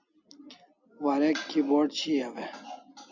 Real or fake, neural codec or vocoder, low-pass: real; none; 7.2 kHz